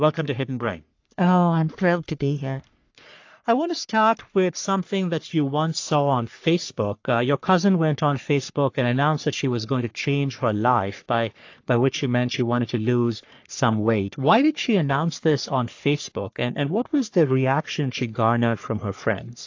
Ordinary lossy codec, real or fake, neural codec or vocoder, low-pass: AAC, 48 kbps; fake; codec, 44.1 kHz, 3.4 kbps, Pupu-Codec; 7.2 kHz